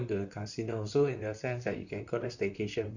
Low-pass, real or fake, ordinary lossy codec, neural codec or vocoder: 7.2 kHz; fake; none; vocoder, 44.1 kHz, 128 mel bands, Pupu-Vocoder